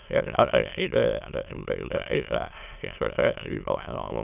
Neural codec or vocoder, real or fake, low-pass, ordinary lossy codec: autoencoder, 22.05 kHz, a latent of 192 numbers a frame, VITS, trained on many speakers; fake; 3.6 kHz; none